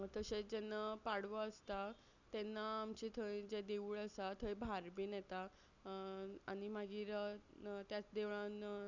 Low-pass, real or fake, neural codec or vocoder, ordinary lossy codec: 7.2 kHz; real; none; none